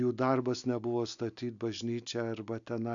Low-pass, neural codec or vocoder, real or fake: 7.2 kHz; none; real